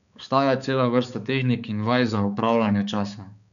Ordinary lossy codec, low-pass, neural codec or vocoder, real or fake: none; 7.2 kHz; codec, 16 kHz, 4 kbps, X-Codec, HuBERT features, trained on balanced general audio; fake